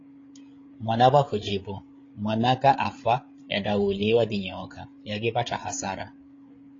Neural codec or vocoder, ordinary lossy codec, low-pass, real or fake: codec, 16 kHz, 8 kbps, FreqCodec, larger model; AAC, 32 kbps; 7.2 kHz; fake